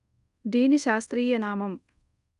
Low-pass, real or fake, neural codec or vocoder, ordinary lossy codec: 10.8 kHz; fake; codec, 24 kHz, 0.5 kbps, DualCodec; none